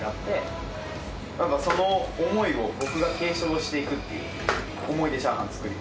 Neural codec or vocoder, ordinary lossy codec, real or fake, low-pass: none; none; real; none